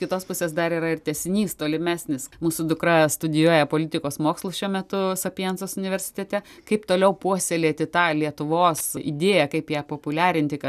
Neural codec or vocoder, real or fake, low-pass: none; real; 14.4 kHz